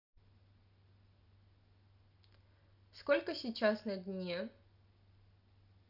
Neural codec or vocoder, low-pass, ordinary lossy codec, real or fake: none; 5.4 kHz; none; real